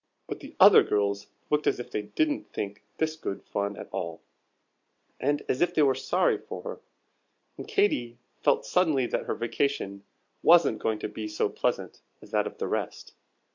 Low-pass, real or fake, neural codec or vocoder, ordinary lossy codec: 7.2 kHz; real; none; MP3, 64 kbps